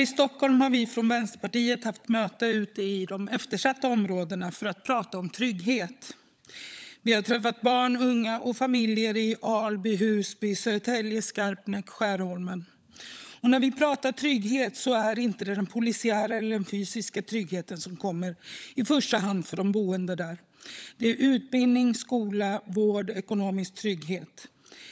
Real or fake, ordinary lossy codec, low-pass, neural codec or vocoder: fake; none; none; codec, 16 kHz, 16 kbps, FunCodec, trained on LibriTTS, 50 frames a second